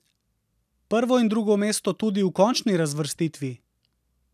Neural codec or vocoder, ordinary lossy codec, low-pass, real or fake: none; none; 14.4 kHz; real